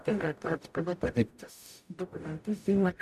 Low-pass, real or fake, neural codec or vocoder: 14.4 kHz; fake; codec, 44.1 kHz, 0.9 kbps, DAC